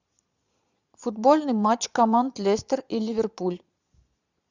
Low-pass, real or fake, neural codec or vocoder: 7.2 kHz; fake; vocoder, 22.05 kHz, 80 mel bands, Vocos